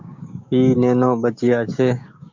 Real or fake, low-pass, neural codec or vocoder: fake; 7.2 kHz; codec, 16 kHz, 6 kbps, DAC